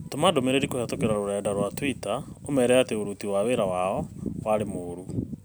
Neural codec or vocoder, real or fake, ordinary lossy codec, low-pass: none; real; none; none